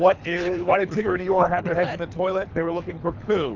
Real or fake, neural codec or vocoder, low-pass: fake; codec, 24 kHz, 3 kbps, HILCodec; 7.2 kHz